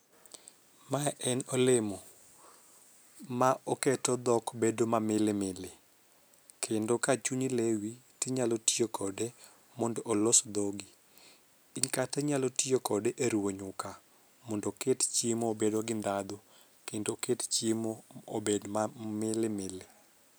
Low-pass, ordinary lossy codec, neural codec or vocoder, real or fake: none; none; none; real